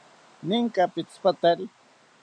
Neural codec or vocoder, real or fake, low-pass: none; real; 9.9 kHz